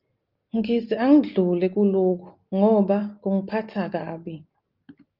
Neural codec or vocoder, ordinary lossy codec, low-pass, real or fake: none; Opus, 24 kbps; 5.4 kHz; real